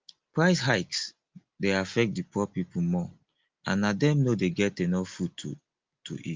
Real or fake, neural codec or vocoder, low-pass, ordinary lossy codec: real; none; 7.2 kHz; Opus, 32 kbps